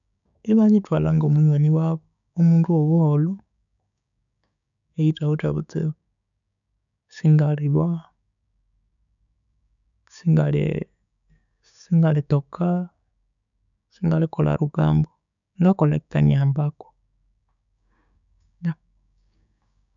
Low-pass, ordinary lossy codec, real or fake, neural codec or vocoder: 7.2 kHz; none; fake; codec, 16 kHz, 6 kbps, DAC